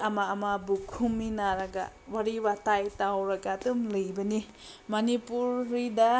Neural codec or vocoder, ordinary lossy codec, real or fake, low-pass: none; none; real; none